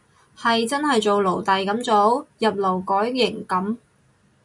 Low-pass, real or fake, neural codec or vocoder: 10.8 kHz; real; none